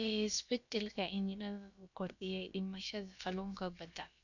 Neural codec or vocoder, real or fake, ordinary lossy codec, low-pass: codec, 16 kHz, about 1 kbps, DyCAST, with the encoder's durations; fake; none; 7.2 kHz